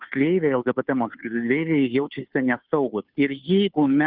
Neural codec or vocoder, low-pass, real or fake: codec, 16 kHz, 2 kbps, FunCodec, trained on Chinese and English, 25 frames a second; 5.4 kHz; fake